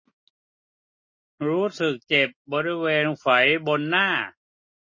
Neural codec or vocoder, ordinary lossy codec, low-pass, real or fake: none; MP3, 32 kbps; 7.2 kHz; real